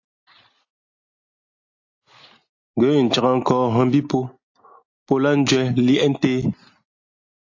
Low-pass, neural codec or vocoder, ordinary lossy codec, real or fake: 7.2 kHz; none; AAC, 48 kbps; real